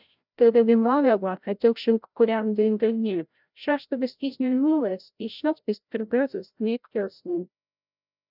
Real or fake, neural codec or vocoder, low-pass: fake; codec, 16 kHz, 0.5 kbps, FreqCodec, larger model; 5.4 kHz